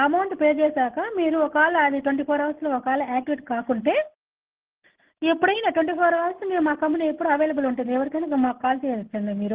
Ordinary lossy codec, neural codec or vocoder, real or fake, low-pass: Opus, 16 kbps; none; real; 3.6 kHz